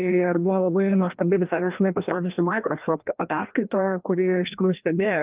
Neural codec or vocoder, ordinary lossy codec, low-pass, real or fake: codec, 16 kHz, 1 kbps, FreqCodec, larger model; Opus, 32 kbps; 3.6 kHz; fake